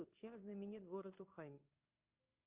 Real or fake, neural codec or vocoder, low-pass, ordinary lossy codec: fake; codec, 16 kHz in and 24 kHz out, 1 kbps, XY-Tokenizer; 3.6 kHz; Opus, 32 kbps